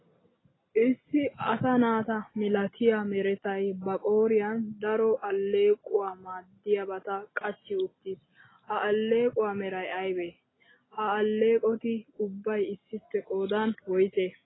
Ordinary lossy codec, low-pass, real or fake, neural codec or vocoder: AAC, 16 kbps; 7.2 kHz; real; none